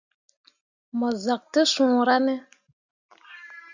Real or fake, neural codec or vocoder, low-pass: real; none; 7.2 kHz